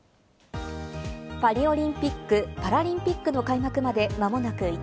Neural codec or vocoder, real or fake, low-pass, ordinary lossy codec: none; real; none; none